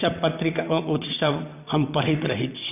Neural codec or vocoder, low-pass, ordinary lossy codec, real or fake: vocoder, 22.05 kHz, 80 mel bands, WaveNeXt; 3.6 kHz; none; fake